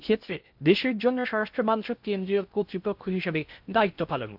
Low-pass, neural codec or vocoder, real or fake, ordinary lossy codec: 5.4 kHz; codec, 16 kHz in and 24 kHz out, 0.6 kbps, FocalCodec, streaming, 2048 codes; fake; AAC, 48 kbps